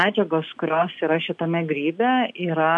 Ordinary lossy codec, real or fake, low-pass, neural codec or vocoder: AAC, 64 kbps; real; 10.8 kHz; none